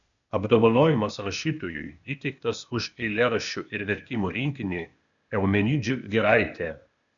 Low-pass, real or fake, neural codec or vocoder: 7.2 kHz; fake; codec, 16 kHz, 0.8 kbps, ZipCodec